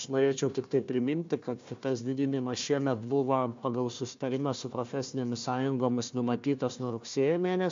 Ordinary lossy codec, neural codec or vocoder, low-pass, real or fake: MP3, 48 kbps; codec, 16 kHz, 1 kbps, FunCodec, trained on Chinese and English, 50 frames a second; 7.2 kHz; fake